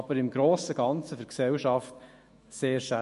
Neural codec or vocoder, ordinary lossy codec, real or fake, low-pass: autoencoder, 48 kHz, 128 numbers a frame, DAC-VAE, trained on Japanese speech; MP3, 48 kbps; fake; 14.4 kHz